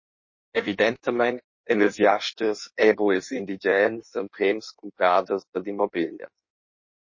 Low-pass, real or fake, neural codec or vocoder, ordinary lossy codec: 7.2 kHz; fake; codec, 16 kHz in and 24 kHz out, 1.1 kbps, FireRedTTS-2 codec; MP3, 32 kbps